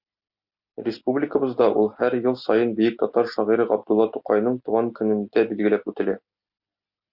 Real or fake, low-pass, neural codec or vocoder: real; 5.4 kHz; none